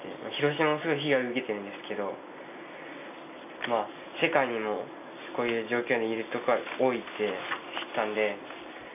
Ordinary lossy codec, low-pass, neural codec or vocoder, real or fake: none; 3.6 kHz; none; real